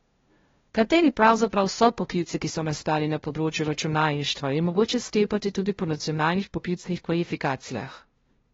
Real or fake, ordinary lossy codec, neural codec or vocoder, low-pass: fake; AAC, 24 kbps; codec, 16 kHz, 0.5 kbps, FunCodec, trained on LibriTTS, 25 frames a second; 7.2 kHz